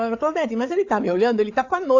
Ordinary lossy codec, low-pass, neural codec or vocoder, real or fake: AAC, 48 kbps; 7.2 kHz; codec, 16 kHz, 8 kbps, FreqCodec, larger model; fake